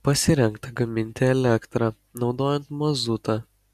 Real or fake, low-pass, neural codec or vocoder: real; 14.4 kHz; none